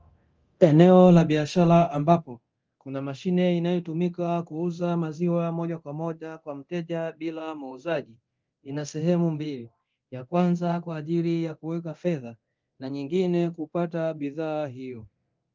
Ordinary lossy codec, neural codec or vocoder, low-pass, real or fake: Opus, 24 kbps; codec, 24 kHz, 0.9 kbps, DualCodec; 7.2 kHz; fake